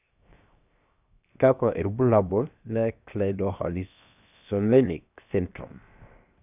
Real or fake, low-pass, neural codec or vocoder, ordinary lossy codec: fake; 3.6 kHz; codec, 16 kHz, 0.7 kbps, FocalCodec; none